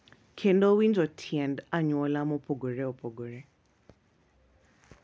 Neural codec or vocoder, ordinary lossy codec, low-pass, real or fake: none; none; none; real